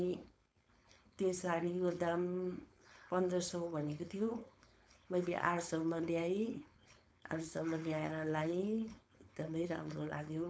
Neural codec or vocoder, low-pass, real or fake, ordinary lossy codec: codec, 16 kHz, 4.8 kbps, FACodec; none; fake; none